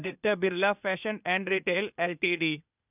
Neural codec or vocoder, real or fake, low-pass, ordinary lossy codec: codec, 16 kHz, 0.8 kbps, ZipCodec; fake; 3.6 kHz; none